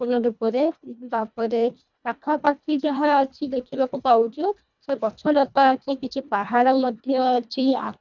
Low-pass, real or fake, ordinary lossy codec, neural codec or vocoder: 7.2 kHz; fake; none; codec, 24 kHz, 1.5 kbps, HILCodec